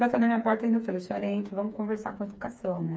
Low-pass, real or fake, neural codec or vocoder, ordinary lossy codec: none; fake; codec, 16 kHz, 4 kbps, FreqCodec, smaller model; none